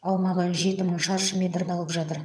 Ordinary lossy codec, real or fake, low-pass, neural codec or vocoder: none; fake; none; vocoder, 22.05 kHz, 80 mel bands, HiFi-GAN